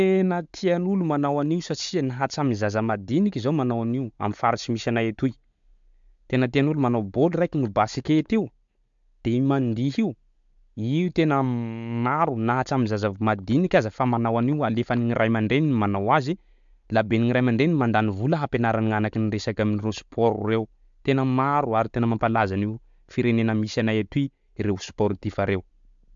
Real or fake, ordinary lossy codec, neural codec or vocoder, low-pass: real; MP3, 64 kbps; none; 7.2 kHz